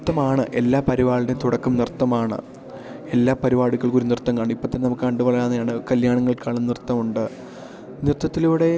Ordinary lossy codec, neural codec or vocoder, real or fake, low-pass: none; none; real; none